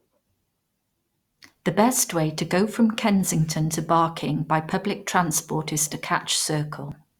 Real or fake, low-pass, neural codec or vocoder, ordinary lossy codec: real; 19.8 kHz; none; Opus, 64 kbps